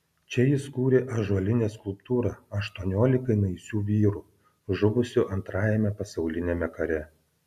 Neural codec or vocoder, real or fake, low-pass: none; real; 14.4 kHz